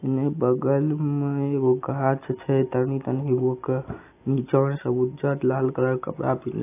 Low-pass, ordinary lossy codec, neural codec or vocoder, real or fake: 3.6 kHz; AAC, 24 kbps; none; real